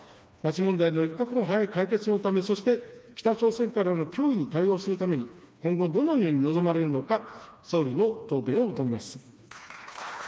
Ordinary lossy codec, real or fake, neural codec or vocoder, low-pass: none; fake; codec, 16 kHz, 2 kbps, FreqCodec, smaller model; none